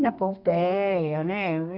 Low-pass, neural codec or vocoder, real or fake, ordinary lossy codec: 5.4 kHz; codec, 32 kHz, 1.9 kbps, SNAC; fake; none